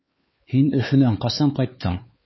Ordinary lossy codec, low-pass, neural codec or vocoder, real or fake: MP3, 24 kbps; 7.2 kHz; codec, 16 kHz, 4 kbps, X-Codec, HuBERT features, trained on LibriSpeech; fake